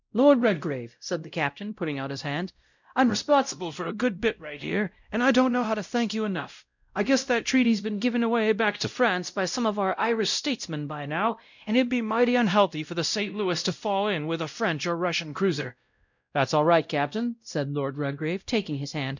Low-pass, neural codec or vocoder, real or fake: 7.2 kHz; codec, 16 kHz, 0.5 kbps, X-Codec, WavLM features, trained on Multilingual LibriSpeech; fake